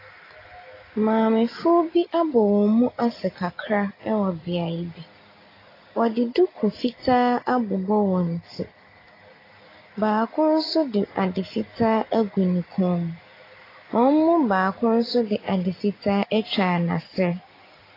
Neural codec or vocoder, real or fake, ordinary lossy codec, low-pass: none; real; AAC, 24 kbps; 5.4 kHz